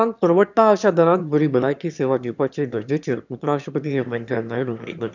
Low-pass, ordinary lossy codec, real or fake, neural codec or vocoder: 7.2 kHz; none; fake; autoencoder, 22.05 kHz, a latent of 192 numbers a frame, VITS, trained on one speaker